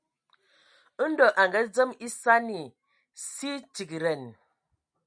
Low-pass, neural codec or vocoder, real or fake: 9.9 kHz; none; real